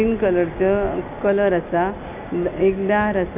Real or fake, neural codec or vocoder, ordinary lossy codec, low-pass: real; none; none; 3.6 kHz